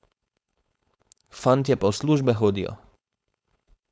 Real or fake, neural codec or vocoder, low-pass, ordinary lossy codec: fake; codec, 16 kHz, 4.8 kbps, FACodec; none; none